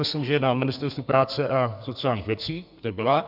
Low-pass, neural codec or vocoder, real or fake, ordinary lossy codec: 5.4 kHz; codec, 32 kHz, 1.9 kbps, SNAC; fake; AAC, 48 kbps